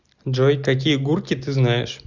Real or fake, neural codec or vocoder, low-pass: real; none; 7.2 kHz